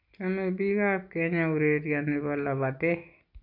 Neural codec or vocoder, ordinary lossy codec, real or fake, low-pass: none; none; real; 5.4 kHz